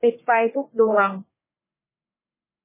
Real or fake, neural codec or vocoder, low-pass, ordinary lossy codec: fake; codec, 44.1 kHz, 1.7 kbps, Pupu-Codec; 3.6 kHz; MP3, 16 kbps